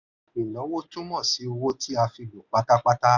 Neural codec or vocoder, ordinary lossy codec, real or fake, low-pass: none; none; real; 7.2 kHz